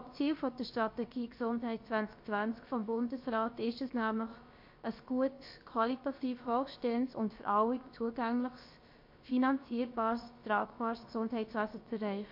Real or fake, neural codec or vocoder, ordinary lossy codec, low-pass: fake; codec, 16 kHz, about 1 kbps, DyCAST, with the encoder's durations; MP3, 32 kbps; 5.4 kHz